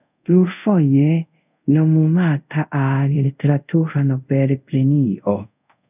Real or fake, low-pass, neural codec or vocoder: fake; 3.6 kHz; codec, 24 kHz, 0.5 kbps, DualCodec